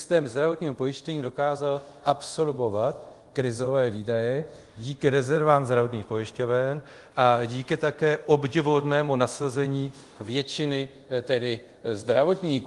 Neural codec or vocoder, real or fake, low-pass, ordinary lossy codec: codec, 24 kHz, 0.5 kbps, DualCodec; fake; 10.8 kHz; Opus, 24 kbps